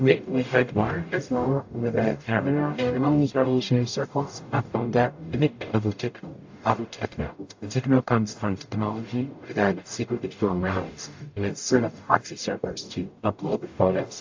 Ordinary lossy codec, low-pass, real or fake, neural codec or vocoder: AAC, 48 kbps; 7.2 kHz; fake; codec, 44.1 kHz, 0.9 kbps, DAC